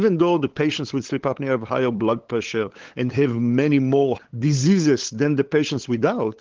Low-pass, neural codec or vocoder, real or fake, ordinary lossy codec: 7.2 kHz; codec, 16 kHz, 8 kbps, FunCodec, trained on LibriTTS, 25 frames a second; fake; Opus, 16 kbps